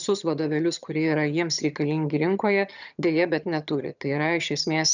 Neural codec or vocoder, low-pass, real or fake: vocoder, 22.05 kHz, 80 mel bands, HiFi-GAN; 7.2 kHz; fake